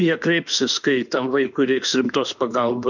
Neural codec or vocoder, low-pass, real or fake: autoencoder, 48 kHz, 32 numbers a frame, DAC-VAE, trained on Japanese speech; 7.2 kHz; fake